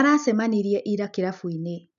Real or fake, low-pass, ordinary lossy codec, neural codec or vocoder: real; 7.2 kHz; none; none